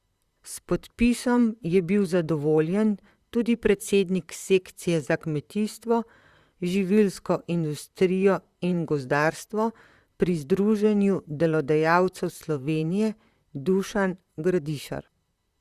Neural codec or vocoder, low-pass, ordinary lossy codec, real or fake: vocoder, 44.1 kHz, 128 mel bands, Pupu-Vocoder; 14.4 kHz; Opus, 64 kbps; fake